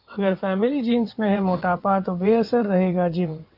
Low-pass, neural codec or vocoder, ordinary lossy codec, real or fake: 5.4 kHz; vocoder, 22.05 kHz, 80 mel bands, WaveNeXt; AAC, 48 kbps; fake